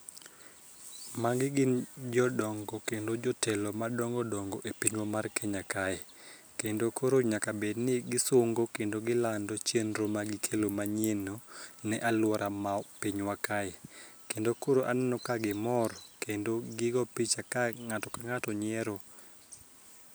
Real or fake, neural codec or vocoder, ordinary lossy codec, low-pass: real; none; none; none